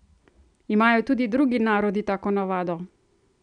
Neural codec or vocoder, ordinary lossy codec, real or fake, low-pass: none; none; real; 9.9 kHz